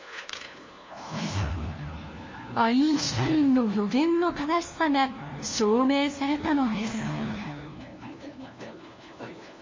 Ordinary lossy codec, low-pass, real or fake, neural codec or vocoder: MP3, 32 kbps; 7.2 kHz; fake; codec, 16 kHz, 1 kbps, FunCodec, trained on LibriTTS, 50 frames a second